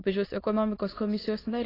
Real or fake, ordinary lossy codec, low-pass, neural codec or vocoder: fake; AAC, 24 kbps; 5.4 kHz; autoencoder, 22.05 kHz, a latent of 192 numbers a frame, VITS, trained on many speakers